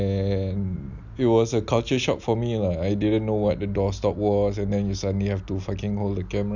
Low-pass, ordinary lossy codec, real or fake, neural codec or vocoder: 7.2 kHz; none; real; none